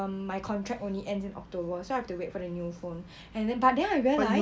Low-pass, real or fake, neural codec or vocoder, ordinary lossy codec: none; real; none; none